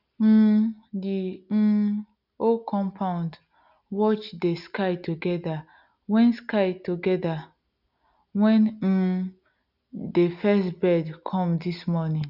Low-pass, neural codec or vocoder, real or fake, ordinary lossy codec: 5.4 kHz; none; real; none